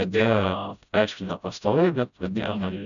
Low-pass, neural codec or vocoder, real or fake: 7.2 kHz; codec, 16 kHz, 0.5 kbps, FreqCodec, smaller model; fake